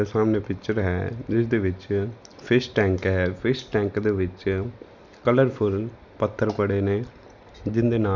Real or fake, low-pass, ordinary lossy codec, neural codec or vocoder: real; 7.2 kHz; none; none